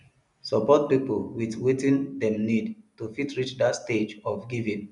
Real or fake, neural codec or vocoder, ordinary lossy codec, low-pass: real; none; none; 10.8 kHz